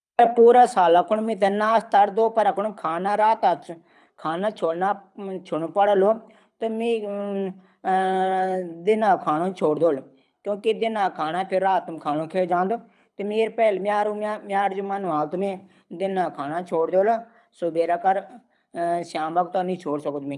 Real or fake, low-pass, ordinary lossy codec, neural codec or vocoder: fake; none; none; codec, 24 kHz, 6 kbps, HILCodec